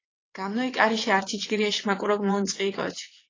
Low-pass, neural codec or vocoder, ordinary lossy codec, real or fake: 7.2 kHz; vocoder, 22.05 kHz, 80 mel bands, WaveNeXt; AAC, 32 kbps; fake